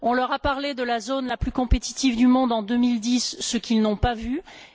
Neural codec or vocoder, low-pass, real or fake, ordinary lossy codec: none; none; real; none